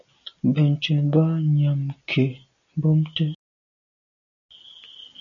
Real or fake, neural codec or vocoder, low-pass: real; none; 7.2 kHz